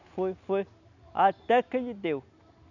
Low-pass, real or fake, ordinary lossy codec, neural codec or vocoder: 7.2 kHz; real; none; none